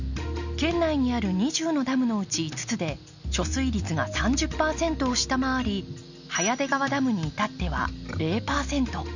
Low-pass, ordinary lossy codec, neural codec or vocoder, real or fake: 7.2 kHz; none; none; real